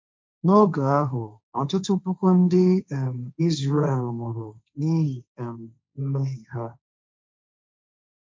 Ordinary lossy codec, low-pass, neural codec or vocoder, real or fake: none; none; codec, 16 kHz, 1.1 kbps, Voila-Tokenizer; fake